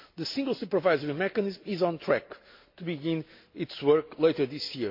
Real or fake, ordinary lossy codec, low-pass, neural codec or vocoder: real; MP3, 32 kbps; 5.4 kHz; none